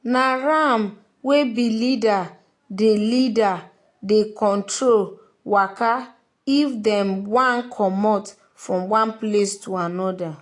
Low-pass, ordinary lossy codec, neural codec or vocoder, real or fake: 10.8 kHz; AAC, 48 kbps; none; real